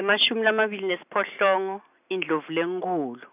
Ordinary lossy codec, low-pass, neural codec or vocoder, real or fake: none; 3.6 kHz; none; real